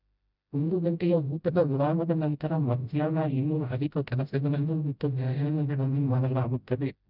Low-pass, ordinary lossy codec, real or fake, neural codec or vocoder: 5.4 kHz; none; fake; codec, 16 kHz, 0.5 kbps, FreqCodec, smaller model